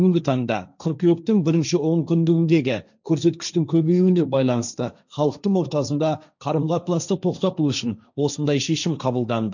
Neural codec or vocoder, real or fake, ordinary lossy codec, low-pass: codec, 16 kHz, 1.1 kbps, Voila-Tokenizer; fake; none; 7.2 kHz